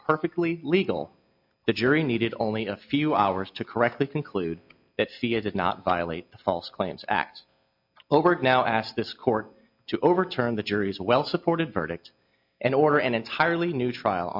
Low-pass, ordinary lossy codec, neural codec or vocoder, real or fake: 5.4 kHz; MP3, 48 kbps; none; real